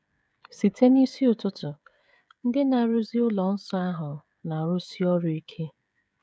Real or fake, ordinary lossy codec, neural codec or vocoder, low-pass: fake; none; codec, 16 kHz, 16 kbps, FreqCodec, smaller model; none